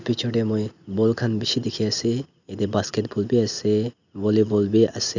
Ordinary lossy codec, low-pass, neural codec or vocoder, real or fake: none; 7.2 kHz; none; real